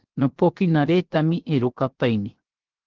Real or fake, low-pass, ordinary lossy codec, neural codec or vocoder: fake; 7.2 kHz; Opus, 16 kbps; codec, 16 kHz, 0.7 kbps, FocalCodec